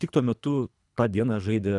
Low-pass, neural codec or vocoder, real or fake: 10.8 kHz; codec, 24 kHz, 3 kbps, HILCodec; fake